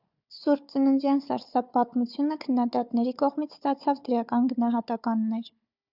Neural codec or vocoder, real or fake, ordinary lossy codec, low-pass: codec, 16 kHz, 4 kbps, FunCodec, trained on Chinese and English, 50 frames a second; fake; Opus, 64 kbps; 5.4 kHz